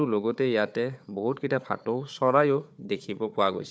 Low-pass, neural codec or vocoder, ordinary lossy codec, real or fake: none; codec, 16 kHz, 4 kbps, FunCodec, trained on Chinese and English, 50 frames a second; none; fake